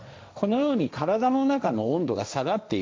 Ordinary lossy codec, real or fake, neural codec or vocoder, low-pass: none; fake; codec, 16 kHz, 1.1 kbps, Voila-Tokenizer; none